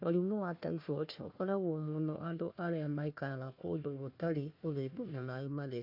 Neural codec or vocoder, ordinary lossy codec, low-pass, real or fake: codec, 16 kHz, 1 kbps, FunCodec, trained on Chinese and English, 50 frames a second; MP3, 32 kbps; 5.4 kHz; fake